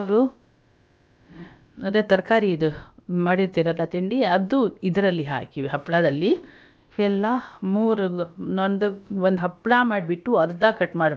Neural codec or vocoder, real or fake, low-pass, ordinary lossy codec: codec, 16 kHz, about 1 kbps, DyCAST, with the encoder's durations; fake; none; none